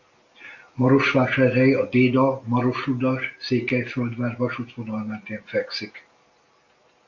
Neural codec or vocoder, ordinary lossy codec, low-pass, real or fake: none; MP3, 48 kbps; 7.2 kHz; real